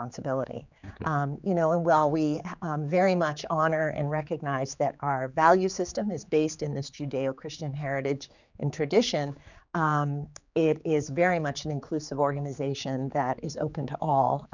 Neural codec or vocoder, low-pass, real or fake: codec, 16 kHz, 4 kbps, X-Codec, HuBERT features, trained on general audio; 7.2 kHz; fake